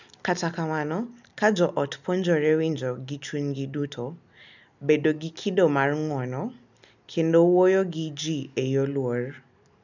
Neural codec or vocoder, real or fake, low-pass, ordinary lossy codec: none; real; 7.2 kHz; none